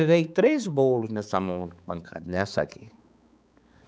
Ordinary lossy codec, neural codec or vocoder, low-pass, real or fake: none; codec, 16 kHz, 4 kbps, X-Codec, HuBERT features, trained on balanced general audio; none; fake